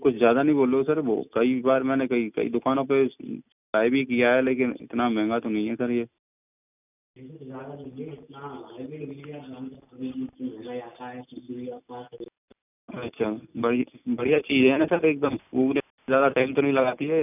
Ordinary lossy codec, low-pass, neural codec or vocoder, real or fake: none; 3.6 kHz; none; real